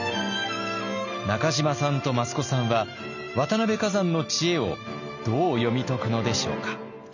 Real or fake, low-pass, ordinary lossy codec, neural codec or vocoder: real; 7.2 kHz; none; none